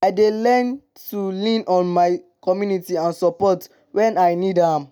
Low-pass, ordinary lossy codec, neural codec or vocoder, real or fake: none; none; none; real